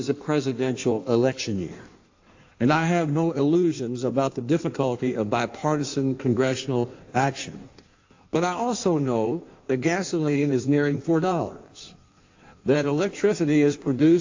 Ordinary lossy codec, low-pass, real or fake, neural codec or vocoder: AAC, 48 kbps; 7.2 kHz; fake; codec, 16 kHz in and 24 kHz out, 1.1 kbps, FireRedTTS-2 codec